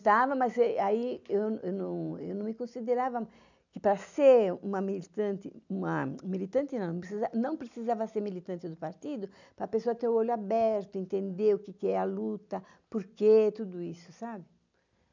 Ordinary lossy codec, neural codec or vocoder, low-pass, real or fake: none; none; 7.2 kHz; real